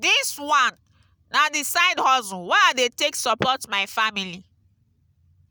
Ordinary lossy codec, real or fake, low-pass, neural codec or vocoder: none; real; none; none